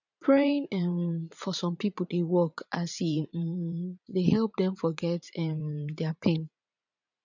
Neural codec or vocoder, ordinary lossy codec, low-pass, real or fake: vocoder, 44.1 kHz, 80 mel bands, Vocos; none; 7.2 kHz; fake